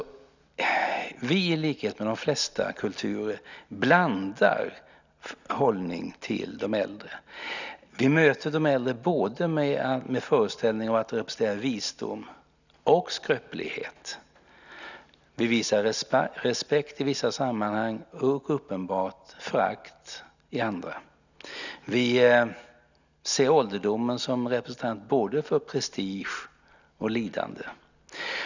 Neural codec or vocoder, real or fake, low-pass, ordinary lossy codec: none; real; 7.2 kHz; none